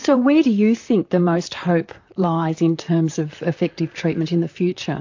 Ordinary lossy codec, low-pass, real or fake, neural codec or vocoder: AAC, 48 kbps; 7.2 kHz; fake; vocoder, 44.1 kHz, 128 mel bands, Pupu-Vocoder